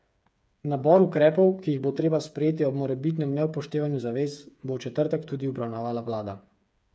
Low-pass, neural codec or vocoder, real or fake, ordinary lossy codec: none; codec, 16 kHz, 8 kbps, FreqCodec, smaller model; fake; none